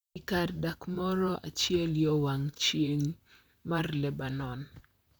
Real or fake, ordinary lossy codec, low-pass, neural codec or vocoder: fake; none; none; vocoder, 44.1 kHz, 128 mel bands, Pupu-Vocoder